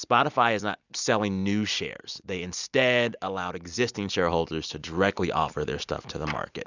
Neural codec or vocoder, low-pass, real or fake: none; 7.2 kHz; real